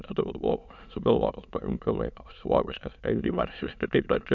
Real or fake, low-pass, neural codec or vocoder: fake; 7.2 kHz; autoencoder, 22.05 kHz, a latent of 192 numbers a frame, VITS, trained on many speakers